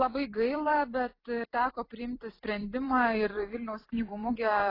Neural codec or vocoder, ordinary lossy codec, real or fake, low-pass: vocoder, 44.1 kHz, 128 mel bands every 256 samples, BigVGAN v2; AAC, 24 kbps; fake; 5.4 kHz